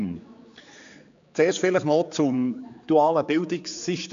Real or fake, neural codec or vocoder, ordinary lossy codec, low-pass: fake; codec, 16 kHz, 4 kbps, X-Codec, HuBERT features, trained on general audio; AAC, 64 kbps; 7.2 kHz